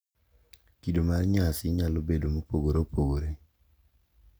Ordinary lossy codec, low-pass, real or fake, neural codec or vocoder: none; none; real; none